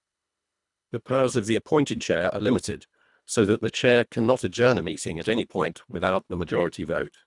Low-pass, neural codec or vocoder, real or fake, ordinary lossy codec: 10.8 kHz; codec, 24 kHz, 1.5 kbps, HILCodec; fake; none